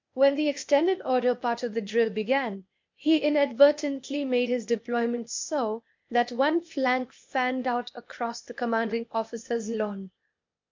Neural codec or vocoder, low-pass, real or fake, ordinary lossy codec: codec, 16 kHz, 0.8 kbps, ZipCodec; 7.2 kHz; fake; MP3, 48 kbps